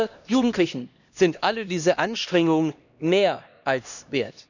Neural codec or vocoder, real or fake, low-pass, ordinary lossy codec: codec, 16 kHz, 1 kbps, X-Codec, HuBERT features, trained on LibriSpeech; fake; 7.2 kHz; none